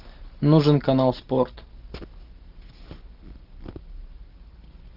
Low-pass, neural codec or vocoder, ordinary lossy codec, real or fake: 5.4 kHz; none; Opus, 32 kbps; real